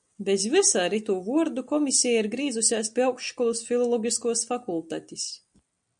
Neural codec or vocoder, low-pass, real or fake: none; 9.9 kHz; real